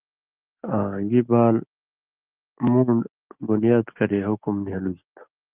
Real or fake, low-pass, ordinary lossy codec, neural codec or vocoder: real; 3.6 kHz; Opus, 24 kbps; none